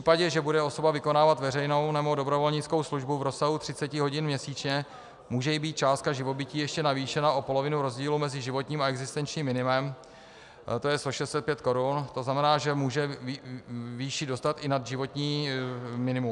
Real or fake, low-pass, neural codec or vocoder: real; 10.8 kHz; none